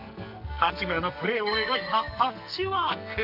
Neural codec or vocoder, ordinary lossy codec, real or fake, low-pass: codec, 44.1 kHz, 2.6 kbps, SNAC; none; fake; 5.4 kHz